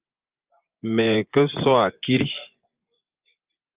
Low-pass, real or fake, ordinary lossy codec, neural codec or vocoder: 3.6 kHz; fake; Opus, 24 kbps; vocoder, 24 kHz, 100 mel bands, Vocos